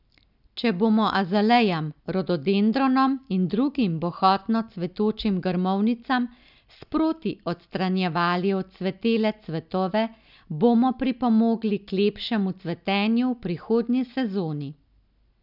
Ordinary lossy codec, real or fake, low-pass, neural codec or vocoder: none; real; 5.4 kHz; none